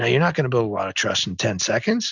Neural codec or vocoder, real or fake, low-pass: none; real; 7.2 kHz